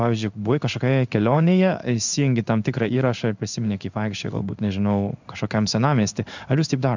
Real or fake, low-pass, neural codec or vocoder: fake; 7.2 kHz; codec, 16 kHz in and 24 kHz out, 1 kbps, XY-Tokenizer